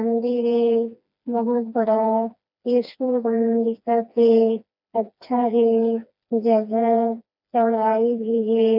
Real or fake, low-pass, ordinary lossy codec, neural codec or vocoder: fake; 5.4 kHz; none; codec, 16 kHz, 2 kbps, FreqCodec, smaller model